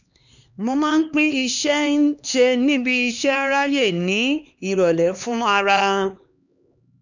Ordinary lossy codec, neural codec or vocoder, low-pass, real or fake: none; codec, 16 kHz, 2 kbps, X-Codec, HuBERT features, trained on LibriSpeech; 7.2 kHz; fake